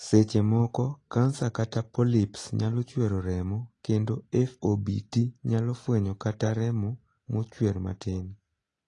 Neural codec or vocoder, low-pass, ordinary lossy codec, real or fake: none; 10.8 kHz; AAC, 32 kbps; real